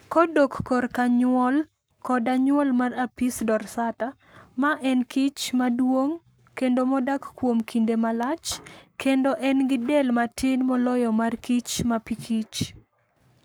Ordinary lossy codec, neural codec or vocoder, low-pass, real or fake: none; codec, 44.1 kHz, 7.8 kbps, Pupu-Codec; none; fake